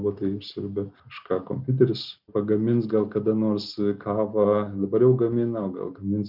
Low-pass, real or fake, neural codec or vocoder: 5.4 kHz; real; none